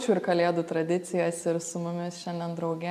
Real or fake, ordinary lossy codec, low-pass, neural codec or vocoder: real; MP3, 96 kbps; 14.4 kHz; none